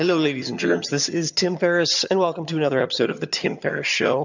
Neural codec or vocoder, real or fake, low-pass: vocoder, 22.05 kHz, 80 mel bands, HiFi-GAN; fake; 7.2 kHz